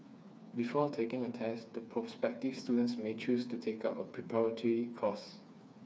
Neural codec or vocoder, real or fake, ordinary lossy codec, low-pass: codec, 16 kHz, 4 kbps, FreqCodec, smaller model; fake; none; none